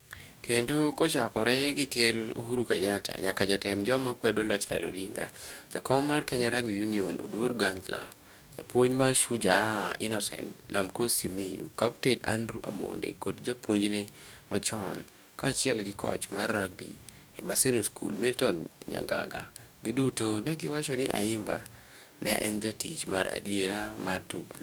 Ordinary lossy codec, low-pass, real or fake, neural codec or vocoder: none; none; fake; codec, 44.1 kHz, 2.6 kbps, DAC